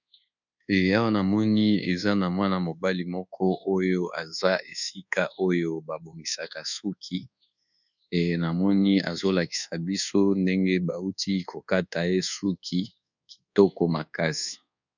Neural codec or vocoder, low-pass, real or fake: codec, 24 kHz, 1.2 kbps, DualCodec; 7.2 kHz; fake